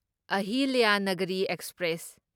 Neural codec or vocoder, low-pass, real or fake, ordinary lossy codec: none; none; real; none